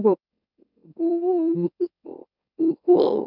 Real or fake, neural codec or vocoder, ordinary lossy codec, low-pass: fake; autoencoder, 44.1 kHz, a latent of 192 numbers a frame, MeloTTS; none; 5.4 kHz